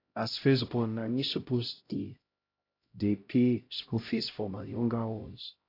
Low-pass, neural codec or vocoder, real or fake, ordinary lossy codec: 5.4 kHz; codec, 16 kHz, 0.5 kbps, X-Codec, HuBERT features, trained on LibriSpeech; fake; AAC, 32 kbps